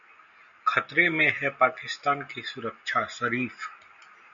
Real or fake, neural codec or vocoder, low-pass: real; none; 7.2 kHz